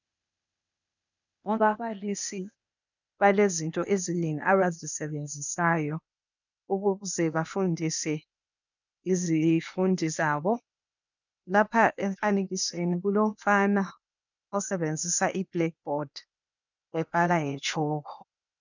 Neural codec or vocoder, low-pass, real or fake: codec, 16 kHz, 0.8 kbps, ZipCodec; 7.2 kHz; fake